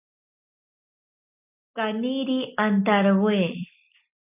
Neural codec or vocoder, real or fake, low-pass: none; real; 3.6 kHz